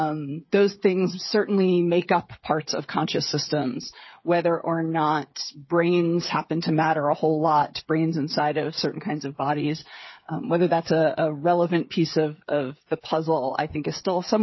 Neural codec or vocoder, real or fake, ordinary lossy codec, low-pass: codec, 16 kHz, 8 kbps, FreqCodec, smaller model; fake; MP3, 24 kbps; 7.2 kHz